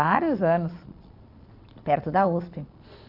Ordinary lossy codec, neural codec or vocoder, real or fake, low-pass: none; vocoder, 22.05 kHz, 80 mel bands, Vocos; fake; 5.4 kHz